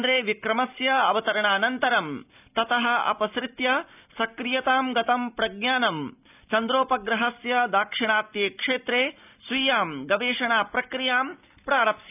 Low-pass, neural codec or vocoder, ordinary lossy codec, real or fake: 3.6 kHz; none; none; real